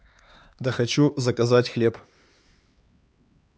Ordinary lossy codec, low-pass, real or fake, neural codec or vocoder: none; none; fake; codec, 16 kHz, 4 kbps, X-Codec, HuBERT features, trained on LibriSpeech